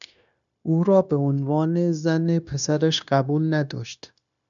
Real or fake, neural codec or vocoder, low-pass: fake; codec, 16 kHz, 0.9 kbps, LongCat-Audio-Codec; 7.2 kHz